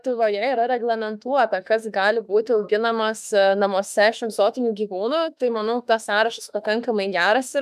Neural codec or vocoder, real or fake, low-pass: autoencoder, 48 kHz, 32 numbers a frame, DAC-VAE, trained on Japanese speech; fake; 14.4 kHz